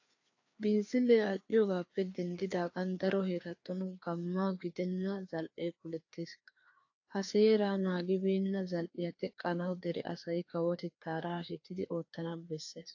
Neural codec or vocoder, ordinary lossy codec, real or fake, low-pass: codec, 16 kHz, 2 kbps, FreqCodec, larger model; AAC, 48 kbps; fake; 7.2 kHz